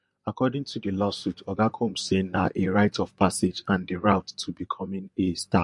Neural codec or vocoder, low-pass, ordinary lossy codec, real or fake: vocoder, 44.1 kHz, 128 mel bands, Pupu-Vocoder; 10.8 kHz; MP3, 48 kbps; fake